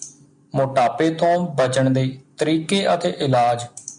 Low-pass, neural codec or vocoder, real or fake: 9.9 kHz; none; real